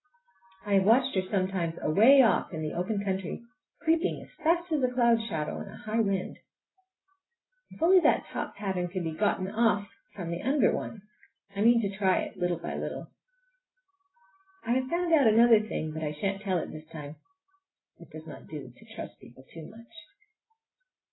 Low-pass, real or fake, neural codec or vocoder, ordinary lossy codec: 7.2 kHz; real; none; AAC, 16 kbps